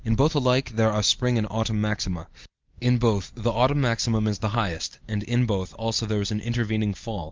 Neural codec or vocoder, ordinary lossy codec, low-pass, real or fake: none; Opus, 24 kbps; 7.2 kHz; real